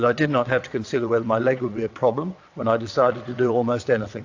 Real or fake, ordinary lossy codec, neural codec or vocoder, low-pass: fake; AAC, 48 kbps; vocoder, 22.05 kHz, 80 mel bands, WaveNeXt; 7.2 kHz